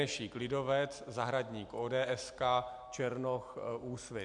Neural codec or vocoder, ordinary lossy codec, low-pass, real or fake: none; MP3, 64 kbps; 10.8 kHz; real